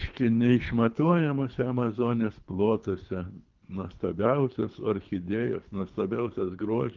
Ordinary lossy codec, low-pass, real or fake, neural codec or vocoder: Opus, 32 kbps; 7.2 kHz; fake; codec, 24 kHz, 3 kbps, HILCodec